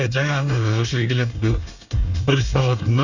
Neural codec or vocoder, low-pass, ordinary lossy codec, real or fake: codec, 24 kHz, 1 kbps, SNAC; 7.2 kHz; none; fake